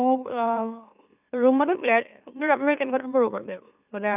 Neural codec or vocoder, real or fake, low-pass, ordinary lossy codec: autoencoder, 44.1 kHz, a latent of 192 numbers a frame, MeloTTS; fake; 3.6 kHz; none